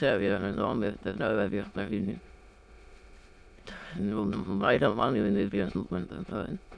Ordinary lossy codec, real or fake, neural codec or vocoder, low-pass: none; fake; autoencoder, 22.05 kHz, a latent of 192 numbers a frame, VITS, trained on many speakers; none